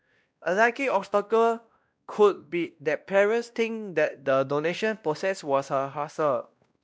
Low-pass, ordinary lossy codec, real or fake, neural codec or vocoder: none; none; fake; codec, 16 kHz, 1 kbps, X-Codec, WavLM features, trained on Multilingual LibriSpeech